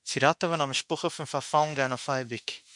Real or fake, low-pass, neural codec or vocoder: fake; 10.8 kHz; autoencoder, 48 kHz, 32 numbers a frame, DAC-VAE, trained on Japanese speech